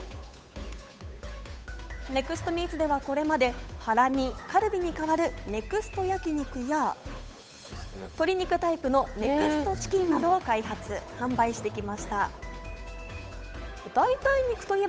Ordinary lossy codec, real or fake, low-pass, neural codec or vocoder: none; fake; none; codec, 16 kHz, 8 kbps, FunCodec, trained on Chinese and English, 25 frames a second